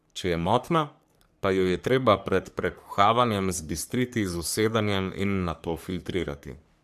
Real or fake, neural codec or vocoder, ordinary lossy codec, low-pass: fake; codec, 44.1 kHz, 3.4 kbps, Pupu-Codec; AAC, 96 kbps; 14.4 kHz